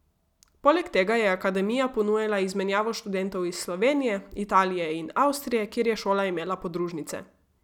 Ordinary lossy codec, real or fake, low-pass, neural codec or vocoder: none; real; 19.8 kHz; none